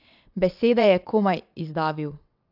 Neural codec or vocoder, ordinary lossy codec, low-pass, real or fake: vocoder, 22.05 kHz, 80 mel bands, WaveNeXt; AAC, 48 kbps; 5.4 kHz; fake